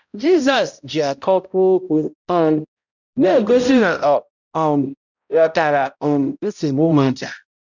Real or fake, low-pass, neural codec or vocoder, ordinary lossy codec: fake; 7.2 kHz; codec, 16 kHz, 0.5 kbps, X-Codec, HuBERT features, trained on balanced general audio; none